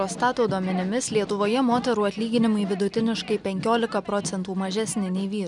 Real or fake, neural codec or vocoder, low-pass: real; none; 10.8 kHz